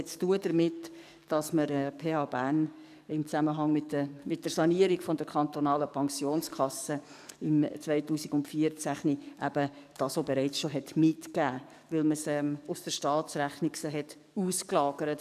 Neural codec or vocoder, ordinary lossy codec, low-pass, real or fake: codec, 44.1 kHz, 7.8 kbps, DAC; none; 14.4 kHz; fake